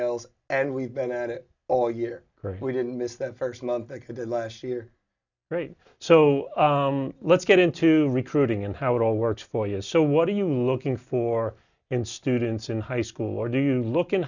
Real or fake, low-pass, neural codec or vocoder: real; 7.2 kHz; none